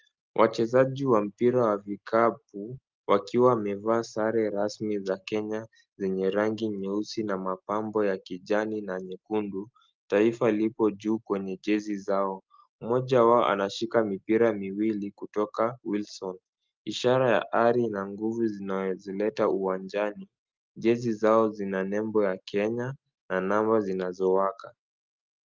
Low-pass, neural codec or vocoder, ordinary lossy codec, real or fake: 7.2 kHz; none; Opus, 24 kbps; real